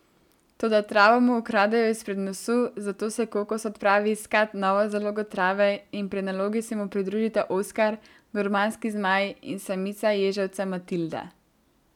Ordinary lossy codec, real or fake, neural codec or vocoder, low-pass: none; real; none; 19.8 kHz